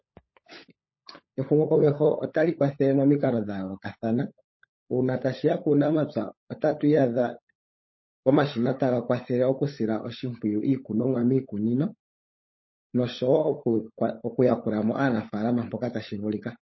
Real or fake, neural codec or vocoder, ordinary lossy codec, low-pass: fake; codec, 16 kHz, 16 kbps, FunCodec, trained on LibriTTS, 50 frames a second; MP3, 24 kbps; 7.2 kHz